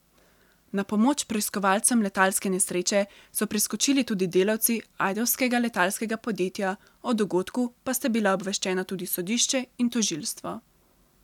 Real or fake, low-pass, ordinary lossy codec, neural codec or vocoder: real; 19.8 kHz; none; none